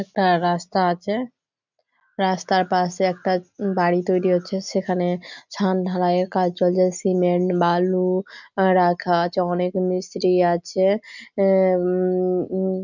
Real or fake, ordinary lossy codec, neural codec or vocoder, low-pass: real; none; none; 7.2 kHz